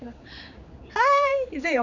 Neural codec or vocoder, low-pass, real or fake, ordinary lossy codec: codec, 16 kHz, 4 kbps, X-Codec, HuBERT features, trained on general audio; 7.2 kHz; fake; none